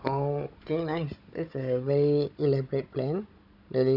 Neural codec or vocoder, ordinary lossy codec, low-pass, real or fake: codec, 16 kHz, 16 kbps, FunCodec, trained on Chinese and English, 50 frames a second; none; 5.4 kHz; fake